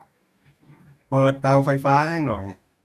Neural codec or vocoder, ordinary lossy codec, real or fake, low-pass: codec, 44.1 kHz, 2.6 kbps, DAC; none; fake; 14.4 kHz